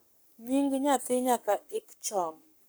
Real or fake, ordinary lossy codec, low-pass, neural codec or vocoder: fake; none; none; codec, 44.1 kHz, 7.8 kbps, Pupu-Codec